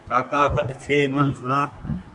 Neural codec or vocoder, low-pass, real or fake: codec, 24 kHz, 1 kbps, SNAC; 10.8 kHz; fake